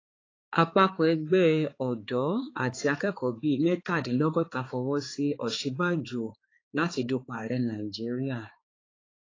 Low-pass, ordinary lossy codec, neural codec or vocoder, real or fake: 7.2 kHz; AAC, 32 kbps; codec, 16 kHz, 4 kbps, X-Codec, HuBERT features, trained on balanced general audio; fake